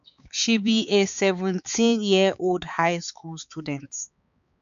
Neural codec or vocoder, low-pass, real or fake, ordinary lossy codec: codec, 16 kHz, 4 kbps, X-Codec, HuBERT features, trained on balanced general audio; 7.2 kHz; fake; none